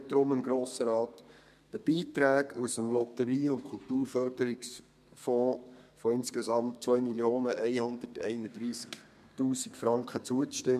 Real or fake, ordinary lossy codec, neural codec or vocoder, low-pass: fake; MP3, 96 kbps; codec, 32 kHz, 1.9 kbps, SNAC; 14.4 kHz